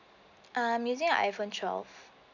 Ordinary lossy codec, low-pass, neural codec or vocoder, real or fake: none; 7.2 kHz; none; real